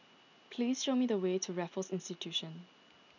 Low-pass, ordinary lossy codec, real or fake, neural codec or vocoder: 7.2 kHz; none; real; none